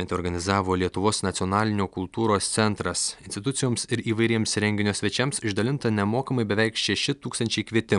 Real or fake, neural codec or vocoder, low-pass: real; none; 10.8 kHz